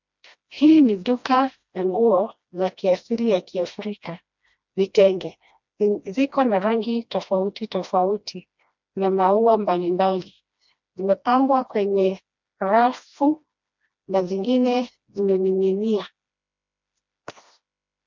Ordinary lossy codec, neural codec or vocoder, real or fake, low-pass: MP3, 64 kbps; codec, 16 kHz, 1 kbps, FreqCodec, smaller model; fake; 7.2 kHz